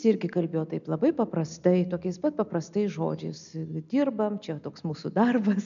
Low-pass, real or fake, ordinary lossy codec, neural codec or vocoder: 7.2 kHz; real; MP3, 96 kbps; none